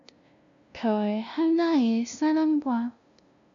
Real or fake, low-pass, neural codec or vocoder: fake; 7.2 kHz; codec, 16 kHz, 0.5 kbps, FunCodec, trained on LibriTTS, 25 frames a second